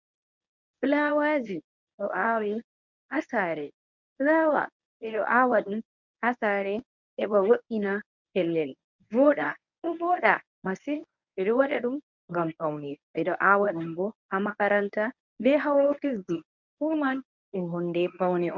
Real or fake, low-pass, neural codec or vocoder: fake; 7.2 kHz; codec, 24 kHz, 0.9 kbps, WavTokenizer, medium speech release version 1